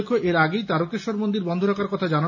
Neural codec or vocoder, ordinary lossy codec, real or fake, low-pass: none; none; real; 7.2 kHz